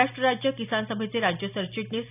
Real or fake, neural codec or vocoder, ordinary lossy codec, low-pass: real; none; none; 3.6 kHz